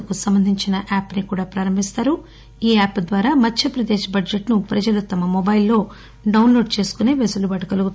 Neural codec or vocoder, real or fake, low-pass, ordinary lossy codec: none; real; none; none